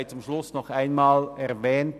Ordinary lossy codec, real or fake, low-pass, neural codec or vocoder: none; real; 14.4 kHz; none